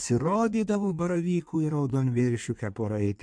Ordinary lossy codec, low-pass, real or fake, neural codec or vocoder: MP3, 64 kbps; 9.9 kHz; fake; codec, 16 kHz in and 24 kHz out, 1.1 kbps, FireRedTTS-2 codec